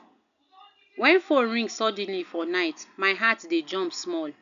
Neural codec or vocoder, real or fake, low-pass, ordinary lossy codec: none; real; 7.2 kHz; none